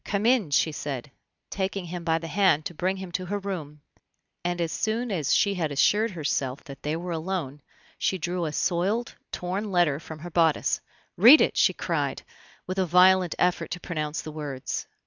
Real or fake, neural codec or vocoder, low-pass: real; none; 7.2 kHz